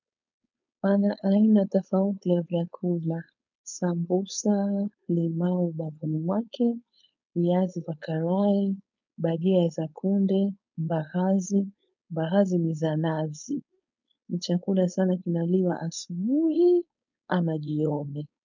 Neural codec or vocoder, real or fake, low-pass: codec, 16 kHz, 4.8 kbps, FACodec; fake; 7.2 kHz